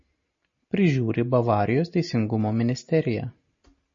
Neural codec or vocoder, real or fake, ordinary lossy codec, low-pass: none; real; MP3, 32 kbps; 7.2 kHz